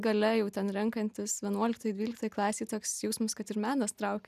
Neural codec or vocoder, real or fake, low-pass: none; real; 14.4 kHz